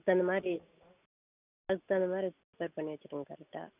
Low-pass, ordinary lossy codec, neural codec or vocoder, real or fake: 3.6 kHz; none; none; real